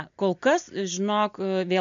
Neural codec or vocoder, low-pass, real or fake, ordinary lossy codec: none; 7.2 kHz; real; MP3, 64 kbps